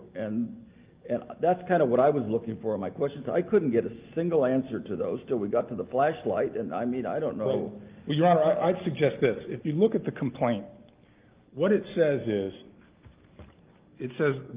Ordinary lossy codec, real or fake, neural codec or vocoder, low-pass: Opus, 32 kbps; real; none; 3.6 kHz